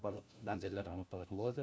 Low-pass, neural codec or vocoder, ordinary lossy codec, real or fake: none; codec, 16 kHz, 1 kbps, FunCodec, trained on LibriTTS, 50 frames a second; none; fake